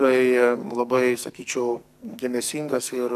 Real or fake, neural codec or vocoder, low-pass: fake; codec, 44.1 kHz, 2.6 kbps, SNAC; 14.4 kHz